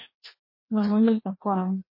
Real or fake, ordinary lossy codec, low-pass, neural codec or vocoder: fake; MP3, 24 kbps; 5.4 kHz; codec, 16 kHz, 1 kbps, FreqCodec, larger model